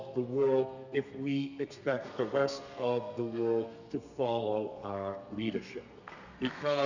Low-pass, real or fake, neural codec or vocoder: 7.2 kHz; fake; codec, 32 kHz, 1.9 kbps, SNAC